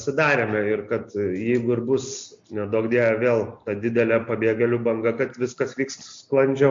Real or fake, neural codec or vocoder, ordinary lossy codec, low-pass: real; none; MP3, 48 kbps; 7.2 kHz